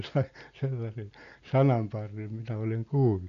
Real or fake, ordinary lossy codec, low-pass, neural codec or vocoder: real; none; 7.2 kHz; none